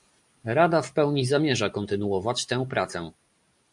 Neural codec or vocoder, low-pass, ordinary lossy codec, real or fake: none; 10.8 kHz; MP3, 64 kbps; real